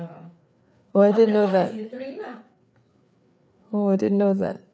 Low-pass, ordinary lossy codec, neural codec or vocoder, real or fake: none; none; codec, 16 kHz, 4 kbps, FreqCodec, larger model; fake